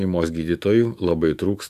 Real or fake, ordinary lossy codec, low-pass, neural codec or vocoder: fake; AAC, 96 kbps; 14.4 kHz; autoencoder, 48 kHz, 128 numbers a frame, DAC-VAE, trained on Japanese speech